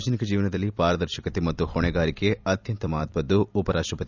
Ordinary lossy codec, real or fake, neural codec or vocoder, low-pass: none; fake; vocoder, 44.1 kHz, 128 mel bands every 256 samples, BigVGAN v2; 7.2 kHz